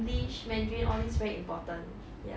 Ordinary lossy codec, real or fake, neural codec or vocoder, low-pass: none; real; none; none